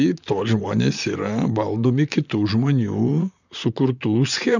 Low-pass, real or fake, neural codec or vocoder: 7.2 kHz; fake; vocoder, 44.1 kHz, 128 mel bands, Pupu-Vocoder